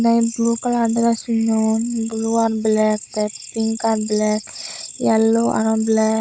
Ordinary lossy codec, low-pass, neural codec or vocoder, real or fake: none; none; codec, 16 kHz, 16 kbps, FunCodec, trained on Chinese and English, 50 frames a second; fake